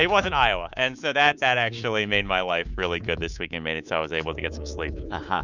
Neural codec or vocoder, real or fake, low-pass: codec, 24 kHz, 3.1 kbps, DualCodec; fake; 7.2 kHz